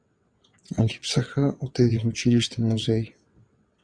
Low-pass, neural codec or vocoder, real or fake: 9.9 kHz; vocoder, 22.05 kHz, 80 mel bands, WaveNeXt; fake